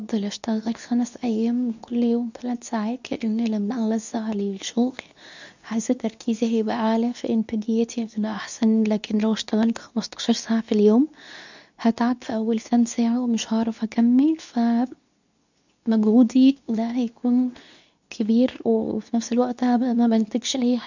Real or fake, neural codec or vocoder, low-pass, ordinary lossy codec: fake; codec, 24 kHz, 0.9 kbps, WavTokenizer, medium speech release version 2; 7.2 kHz; none